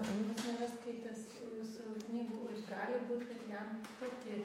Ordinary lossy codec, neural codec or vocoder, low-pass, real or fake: MP3, 64 kbps; vocoder, 48 kHz, 128 mel bands, Vocos; 19.8 kHz; fake